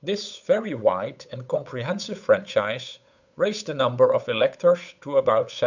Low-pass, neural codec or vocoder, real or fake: 7.2 kHz; codec, 16 kHz, 8 kbps, FunCodec, trained on Chinese and English, 25 frames a second; fake